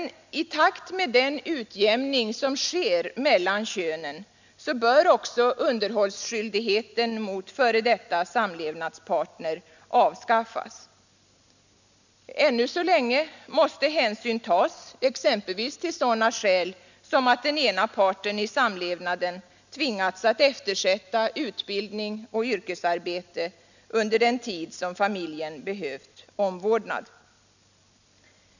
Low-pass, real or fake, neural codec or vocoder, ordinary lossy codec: 7.2 kHz; real; none; none